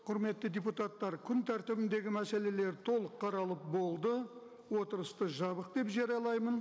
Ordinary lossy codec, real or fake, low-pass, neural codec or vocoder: none; real; none; none